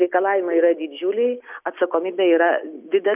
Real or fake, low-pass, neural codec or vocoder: real; 3.6 kHz; none